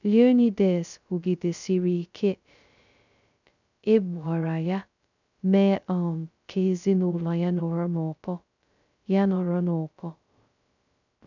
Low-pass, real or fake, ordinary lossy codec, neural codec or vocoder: 7.2 kHz; fake; none; codec, 16 kHz, 0.2 kbps, FocalCodec